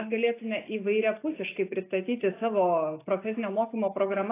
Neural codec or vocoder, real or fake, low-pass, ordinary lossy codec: none; real; 3.6 kHz; AAC, 24 kbps